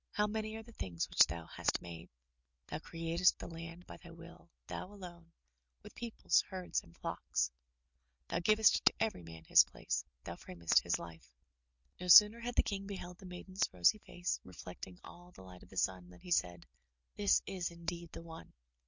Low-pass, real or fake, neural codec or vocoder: 7.2 kHz; real; none